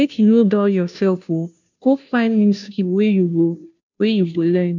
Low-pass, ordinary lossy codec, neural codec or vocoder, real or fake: 7.2 kHz; none; codec, 16 kHz, 0.5 kbps, FunCodec, trained on Chinese and English, 25 frames a second; fake